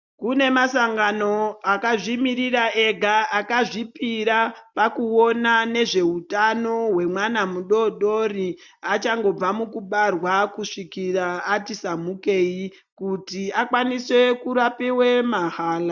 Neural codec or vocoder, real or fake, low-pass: none; real; 7.2 kHz